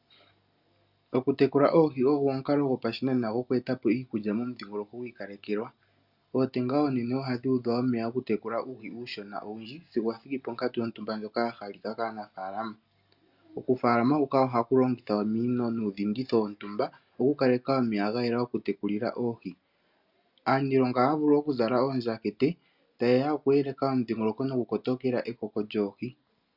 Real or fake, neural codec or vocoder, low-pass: real; none; 5.4 kHz